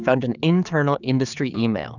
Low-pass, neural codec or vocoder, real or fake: 7.2 kHz; codec, 16 kHz, 4 kbps, X-Codec, HuBERT features, trained on general audio; fake